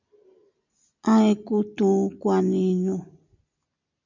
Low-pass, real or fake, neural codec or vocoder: 7.2 kHz; real; none